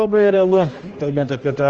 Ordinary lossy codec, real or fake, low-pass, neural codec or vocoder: Opus, 24 kbps; fake; 7.2 kHz; codec, 16 kHz, 1 kbps, X-Codec, HuBERT features, trained on general audio